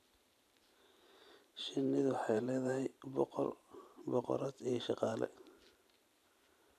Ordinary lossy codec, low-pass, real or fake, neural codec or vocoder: none; 14.4 kHz; fake; vocoder, 44.1 kHz, 128 mel bands every 512 samples, BigVGAN v2